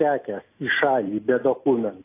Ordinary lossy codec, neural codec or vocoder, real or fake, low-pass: AAC, 24 kbps; none; real; 3.6 kHz